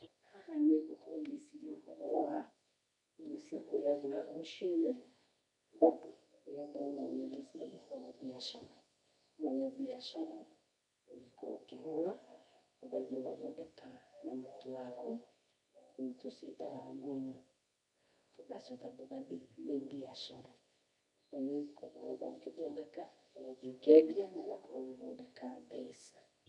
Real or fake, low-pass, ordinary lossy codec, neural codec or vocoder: fake; 10.8 kHz; none; codec, 24 kHz, 0.9 kbps, WavTokenizer, medium music audio release